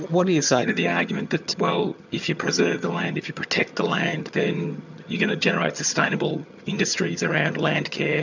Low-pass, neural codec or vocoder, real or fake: 7.2 kHz; vocoder, 22.05 kHz, 80 mel bands, HiFi-GAN; fake